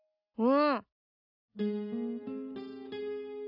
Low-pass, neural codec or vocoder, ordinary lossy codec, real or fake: 5.4 kHz; none; none; real